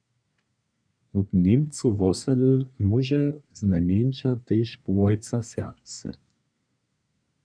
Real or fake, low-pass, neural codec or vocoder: fake; 9.9 kHz; codec, 24 kHz, 1 kbps, SNAC